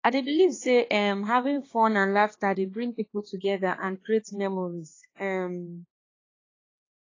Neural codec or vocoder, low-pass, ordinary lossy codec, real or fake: codec, 16 kHz, 2 kbps, X-Codec, HuBERT features, trained on balanced general audio; 7.2 kHz; AAC, 32 kbps; fake